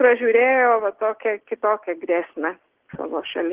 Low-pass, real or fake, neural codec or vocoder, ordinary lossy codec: 3.6 kHz; real; none; Opus, 16 kbps